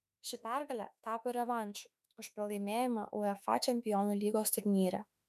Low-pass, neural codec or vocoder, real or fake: 14.4 kHz; autoencoder, 48 kHz, 32 numbers a frame, DAC-VAE, trained on Japanese speech; fake